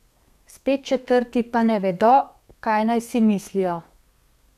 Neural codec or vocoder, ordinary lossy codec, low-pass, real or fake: codec, 32 kHz, 1.9 kbps, SNAC; none; 14.4 kHz; fake